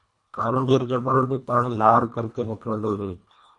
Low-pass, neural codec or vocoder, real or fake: 10.8 kHz; codec, 24 kHz, 1.5 kbps, HILCodec; fake